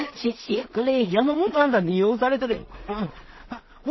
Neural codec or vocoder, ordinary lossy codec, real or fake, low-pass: codec, 16 kHz in and 24 kHz out, 0.4 kbps, LongCat-Audio-Codec, two codebook decoder; MP3, 24 kbps; fake; 7.2 kHz